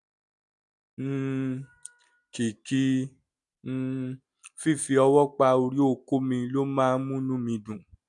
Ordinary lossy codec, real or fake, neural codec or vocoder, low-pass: none; real; none; 10.8 kHz